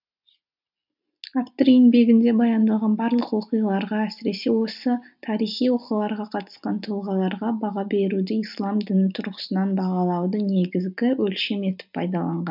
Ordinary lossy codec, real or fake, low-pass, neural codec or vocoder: none; real; 5.4 kHz; none